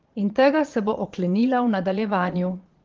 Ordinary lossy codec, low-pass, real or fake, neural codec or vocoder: Opus, 16 kbps; 7.2 kHz; fake; vocoder, 22.05 kHz, 80 mel bands, Vocos